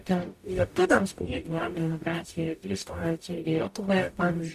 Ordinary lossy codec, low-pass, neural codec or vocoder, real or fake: Opus, 64 kbps; 14.4 kHz; codec, 44.1 kHz, 0.9 kbps, DAC; fake